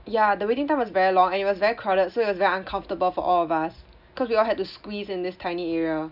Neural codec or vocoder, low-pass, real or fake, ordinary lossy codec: none; 5.4 kHz; real; none